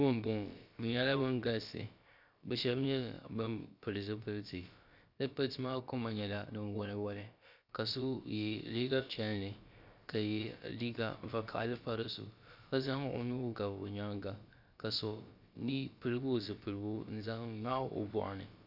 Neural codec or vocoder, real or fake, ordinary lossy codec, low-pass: codec, 16 kHz, about 1 kbps, DyCAST, with the encoder's durations; fake; Opus, 64 kbps; 5.4 kHz